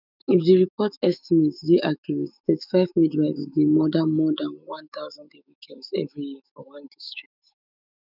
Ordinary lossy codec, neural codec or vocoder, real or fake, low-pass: none; autoencoder, 48 kHz, 128 numbers a frame, DAC-VAE, trained on Japanese speech; fake; 5.4 kHz